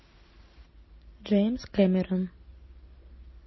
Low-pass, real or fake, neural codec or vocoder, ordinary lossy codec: 7.2 kHz; real; none; MP3, 24 kbps